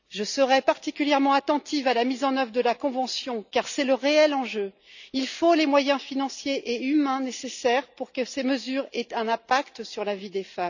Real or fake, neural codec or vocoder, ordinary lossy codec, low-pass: real; none; none; 7.2 kHz